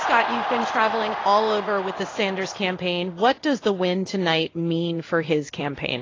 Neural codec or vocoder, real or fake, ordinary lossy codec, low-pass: codec, 16 kHz in and 24 kHz out, 1 kbps, XY-Tokenizer; fake; AAC, 32 kbps; 7.2 kHz